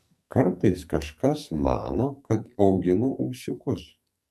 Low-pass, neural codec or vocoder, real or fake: 14.4 kHz; codec, 44.1 kHz, 2.6 kbps, SNAC; fake